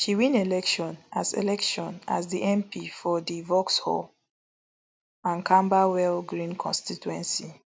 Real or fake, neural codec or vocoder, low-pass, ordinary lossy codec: real; none; none; none